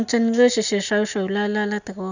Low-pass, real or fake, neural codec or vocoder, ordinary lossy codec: 7.2 kHz; real; none; none